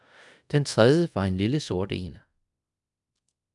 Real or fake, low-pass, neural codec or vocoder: fake; 10.8 kHz; codec, 24 kHz, 0.5 kbps, DualCodec